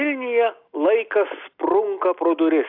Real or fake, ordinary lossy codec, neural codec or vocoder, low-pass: real; AAC, 48 kbps; none; 5.4 kHz